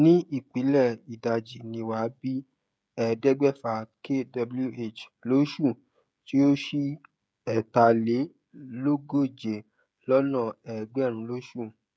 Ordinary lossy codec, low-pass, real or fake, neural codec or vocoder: none; none; fake; codec, 16 kHz, 16 kbps, FreqCodec, smaller model